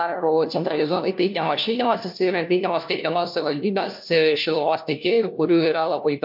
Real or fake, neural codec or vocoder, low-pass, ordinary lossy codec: fake; codec, 16 kHz, 1 kbps, FunCodec, trained on LibriTTS, 50 frames a second; 5.4 kHz; MP3, 48 kbps